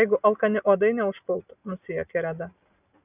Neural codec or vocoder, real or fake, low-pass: none; real; 3.6 kHz